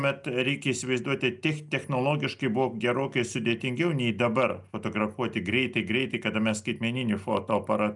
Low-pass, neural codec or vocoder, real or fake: 10.8 kHz; none; real